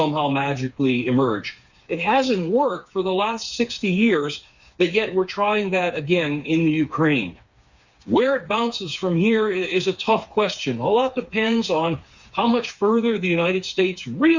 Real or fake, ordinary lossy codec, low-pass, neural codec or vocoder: fake; Opus, 64 kbps; 7.2 kHz; codec, 16 kHz, 4 kbps, FreqCodec, smaller model